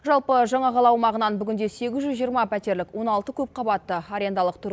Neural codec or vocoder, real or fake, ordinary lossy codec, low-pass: none; real; none; none